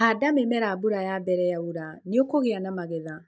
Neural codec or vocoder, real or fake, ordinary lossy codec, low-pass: none; real; none; none